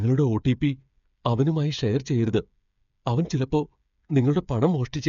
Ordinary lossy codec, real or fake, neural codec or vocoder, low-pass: none; fake; codec, 16 kHz, 8 kbps, FreqCodec, smaller model; 7.2 kHz